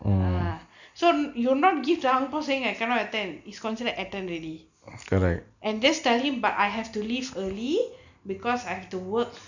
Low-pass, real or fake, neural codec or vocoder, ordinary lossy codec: 7.2 kHz; real; none; none